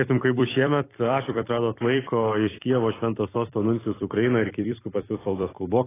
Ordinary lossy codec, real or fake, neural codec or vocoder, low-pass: AAC, 16 kbps; fake; vocoder, 44.1 kHz, 128 mel bands every 256 samples, BigVGAN v2; 3.6 kHz